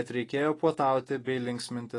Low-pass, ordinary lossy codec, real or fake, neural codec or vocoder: 10.8 kHz; AAC, 32 kbps; real; none